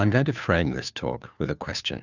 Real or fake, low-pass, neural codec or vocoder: fake; 7.2 kHz; codec, 16 kHz, 2 kbps, FunCodec, trained on Chinese and English, 25 frames a second